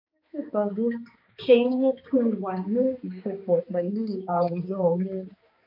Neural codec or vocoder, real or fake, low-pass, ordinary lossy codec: codec, 16 kHz, 2 kbps, X-Codec, HuBERT features, trained on balanced general audio; fake; 5.4 kHz; MP3, 32 kbps